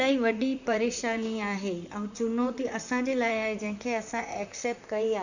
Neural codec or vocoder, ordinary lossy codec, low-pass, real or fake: vocoder, 44.1 kHz, 128 mel bands, Pupu-Vocoder; none; 7.2 kHz; fake